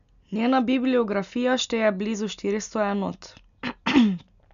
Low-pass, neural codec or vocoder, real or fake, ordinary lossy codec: 7.2 kHz; none; real; none